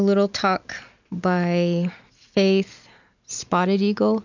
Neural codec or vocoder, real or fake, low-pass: none; real; 7.2 kHz